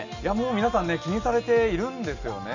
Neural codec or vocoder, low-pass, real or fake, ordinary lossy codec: none; 7.2 kHz; real; none